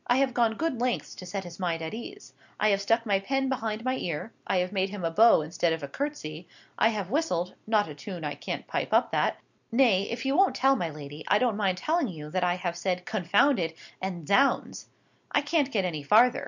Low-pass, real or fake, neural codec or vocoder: 7.2 kHz; real; none